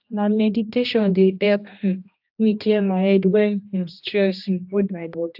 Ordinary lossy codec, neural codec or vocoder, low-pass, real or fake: AAC, 48 kbps; codec, 16 kHz, 1 kbps, X-Codec, HuBERT features, trained on general audio; 5.4 kHz; fake